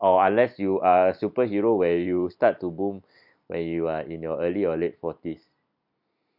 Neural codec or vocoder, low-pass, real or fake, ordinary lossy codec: none; 5.4 kHz; real; none